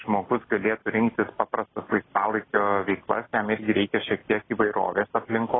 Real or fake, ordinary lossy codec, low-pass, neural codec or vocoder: real; AAC, 16 kbps; 7.2 kHz; none